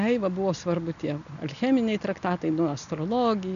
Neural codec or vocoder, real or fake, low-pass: none; real; 7.2 kHz